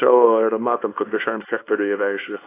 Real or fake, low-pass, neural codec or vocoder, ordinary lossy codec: fake; 3.6 kHz; codec, 24 kHz, 0.9 kbps, WavTokenizer, small release; AAC, 24 kbps